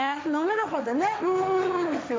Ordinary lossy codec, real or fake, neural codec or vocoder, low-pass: none; fake; codec, 16 kHz, 1.1 kbps, Voila-Tokenizer; none